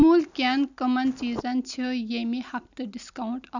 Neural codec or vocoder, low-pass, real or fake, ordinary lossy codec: none; 7.2 kHz; real; none